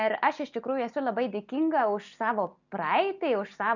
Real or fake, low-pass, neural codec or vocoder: real; 7.2 kHz; none